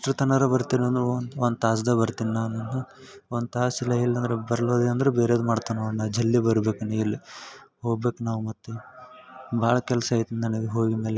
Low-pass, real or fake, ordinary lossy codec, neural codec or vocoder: none; real; none; none